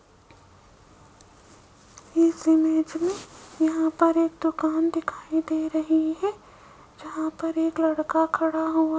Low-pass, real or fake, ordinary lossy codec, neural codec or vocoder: none; real; none; none